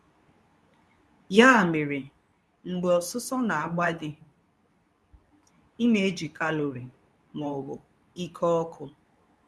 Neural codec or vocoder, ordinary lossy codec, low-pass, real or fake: codec, 24 kHz, 0.9 kbps, WavTokenizer, medium speech release version 2; none; none; fake